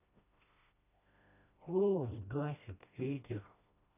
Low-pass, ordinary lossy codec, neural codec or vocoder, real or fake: 3.6 kHz; AAC, 32 kbps; codec, 16 kHz, 1 kbps, FreqCodec, smaller model; fake